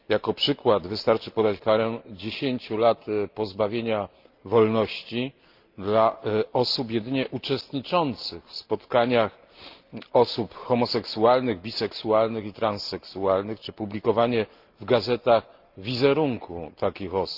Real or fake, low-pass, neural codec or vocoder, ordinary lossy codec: real; 5.4 kHz; none; Opus, 32 kbps